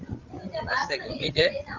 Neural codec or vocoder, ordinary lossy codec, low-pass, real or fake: codec, 16 kHz, 16 kbps, FreqCodec, larger model; Opus, 24 kbps; 7.2 kHz; fake